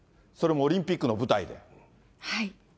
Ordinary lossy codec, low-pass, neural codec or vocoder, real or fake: none; none; none; real